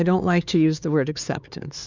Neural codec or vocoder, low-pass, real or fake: codec, 16 kHz, 4 kbps, X-Codec, HuBERT features, trained on LibriSpeech; 7.2 kHz; fake